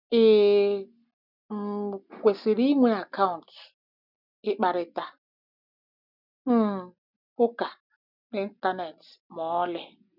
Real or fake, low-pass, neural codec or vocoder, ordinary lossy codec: real; 5.4 kHz; none; none